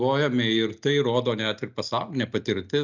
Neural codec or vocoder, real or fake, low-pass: none; real; 7.2 kHz